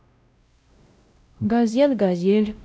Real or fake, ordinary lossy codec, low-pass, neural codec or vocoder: fake; none; none; codec, 16 kHz, 0.5 kbps, X-Codec, WavLM features, trained on Multilingual LibriSpeech